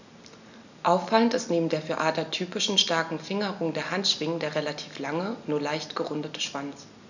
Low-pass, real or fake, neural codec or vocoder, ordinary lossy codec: 7.2 kHz; real; none; none